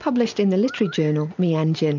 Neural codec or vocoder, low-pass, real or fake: none; 7.2 kHz; real